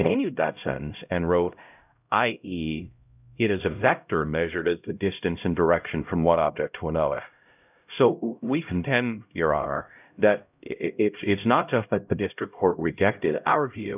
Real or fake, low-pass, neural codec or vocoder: fake; 3.6 kHz; codec, 16 kHz, 0.5 kbps, X-Codec, HuBERT features, trained on LibriSpeech